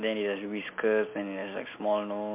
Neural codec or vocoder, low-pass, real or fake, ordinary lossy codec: none; 3.6 kHz; real; MP3, 32 kbps